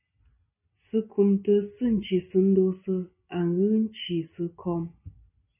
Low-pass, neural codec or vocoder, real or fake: 3.6 kHz; none; real